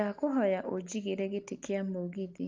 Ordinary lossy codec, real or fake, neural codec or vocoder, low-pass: Opus, 24 kbps; real; none; 7.2 kHz